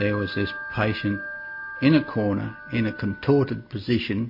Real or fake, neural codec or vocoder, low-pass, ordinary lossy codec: real; none; 5.4 kHz; MP3, 32 kbps